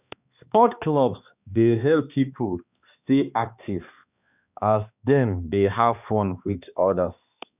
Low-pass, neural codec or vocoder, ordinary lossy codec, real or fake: 3.6 kHz; codec, 16 kHz, 2 kbps, X-Codec, HuBERT features, trained on balanced general audio; none; fake